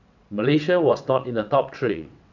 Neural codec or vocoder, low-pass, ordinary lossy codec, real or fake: vocoder, 22.05 kHz, 80 mel bands, WaveNeXt; 7.2 kHz; none; fake